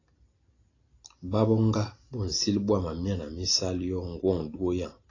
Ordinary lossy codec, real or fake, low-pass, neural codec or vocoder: AAC, 32 kbps; real; 7.2 kHz; none